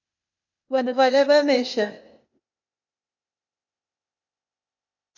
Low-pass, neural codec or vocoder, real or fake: 7.2 kHz; codec, 16 kHz, 0.8 kbps, ZipCodec; fake